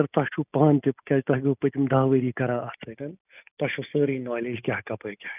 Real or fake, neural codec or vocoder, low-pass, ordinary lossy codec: real; none; 3.6 kHz; none